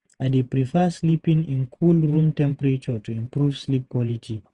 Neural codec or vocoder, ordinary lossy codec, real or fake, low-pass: vocoder, 48 kHz, 128 mel bands, Vocos; none; fake; 10.8 kHz